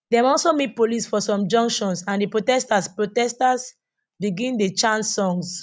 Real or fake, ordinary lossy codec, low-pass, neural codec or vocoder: real; none; none; none